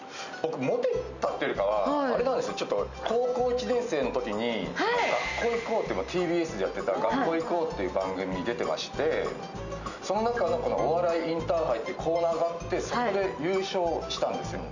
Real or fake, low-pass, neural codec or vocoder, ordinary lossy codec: real; 7.2 kHz; none; none